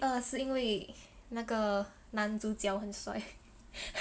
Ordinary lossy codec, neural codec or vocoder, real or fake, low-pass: none; none; real; none